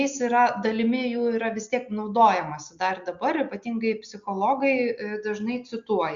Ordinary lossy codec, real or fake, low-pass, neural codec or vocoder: Opus, 64 kbps; real; 7.2 kHz; none